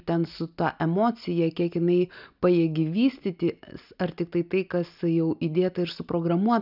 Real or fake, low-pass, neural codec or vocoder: real; 5.4 kHz; none